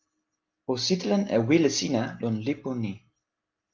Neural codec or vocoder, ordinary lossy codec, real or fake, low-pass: none; Opus, 32 kbps; real; 7.2 kHz